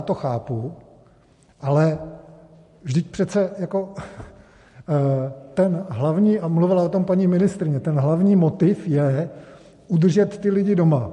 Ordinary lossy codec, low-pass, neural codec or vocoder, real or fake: MP3, 48 kbps; 14.4 kHz; none; real